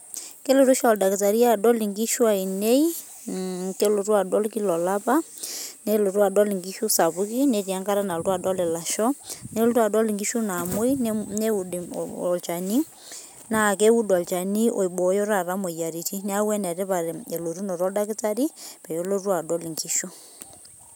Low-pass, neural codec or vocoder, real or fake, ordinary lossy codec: none; none; real; none